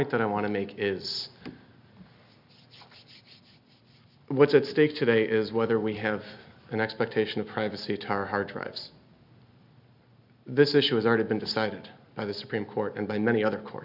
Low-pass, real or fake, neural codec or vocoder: 5.4 kHz; real; none